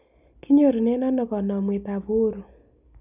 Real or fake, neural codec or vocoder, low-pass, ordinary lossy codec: real; none; 3.6 kHz; none